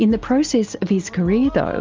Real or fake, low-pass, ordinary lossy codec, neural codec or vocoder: real; 7.2 kHz; Opus, 32 kbps; none